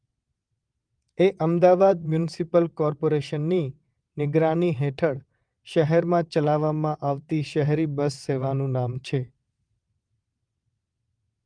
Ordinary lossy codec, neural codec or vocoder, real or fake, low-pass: Opus, 32 kbps; vocoder, 22.05 kHz, 80 mel bands, WaveNeXt; fake; 9.9 kHz